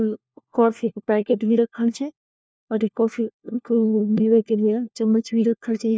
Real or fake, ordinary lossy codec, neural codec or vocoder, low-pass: fake; none; codec, 16 kHz, 1 kbps, FunCodec, trained on LibriTTS, 50 frames a second; none